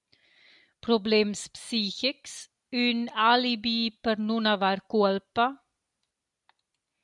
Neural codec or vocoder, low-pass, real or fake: none; 10.8 kHz; real